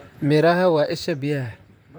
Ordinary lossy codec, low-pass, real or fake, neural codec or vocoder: none; none; fake; vocoder, 44.1 kHz, 128 mel bands, Pupu-Vocoder